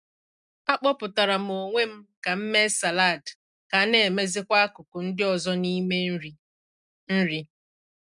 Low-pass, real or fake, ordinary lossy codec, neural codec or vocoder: 10.8 kHz; real; none; none